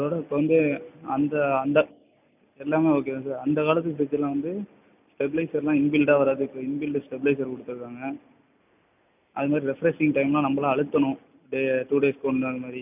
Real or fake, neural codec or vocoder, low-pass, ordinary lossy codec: real; none; 3.6 kHz; none